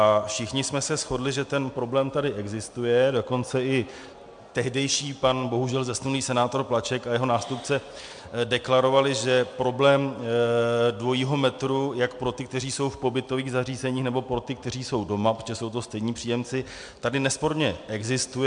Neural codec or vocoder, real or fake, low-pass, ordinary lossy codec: none; real; 9.9 kHz; MP3, 64 kbps